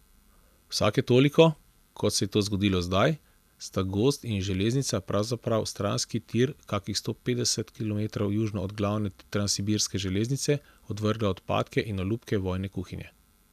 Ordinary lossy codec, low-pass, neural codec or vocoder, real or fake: none; 14.4 kHz; none; real